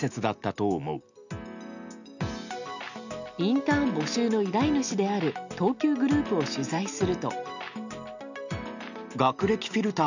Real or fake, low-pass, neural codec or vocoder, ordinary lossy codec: real; 7.2 kHz; none; none